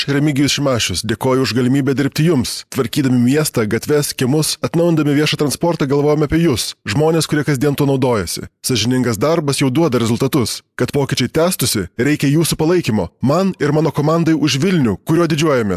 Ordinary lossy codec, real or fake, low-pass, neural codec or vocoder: AAC, 96 kbps; real; 14.4 kHz; none